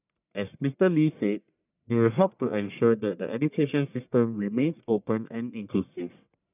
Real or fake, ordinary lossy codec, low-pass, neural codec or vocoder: fake; none; 3.6 kHz; codec, 44.1 kHz, 1.7 kbps, Pupu-Codec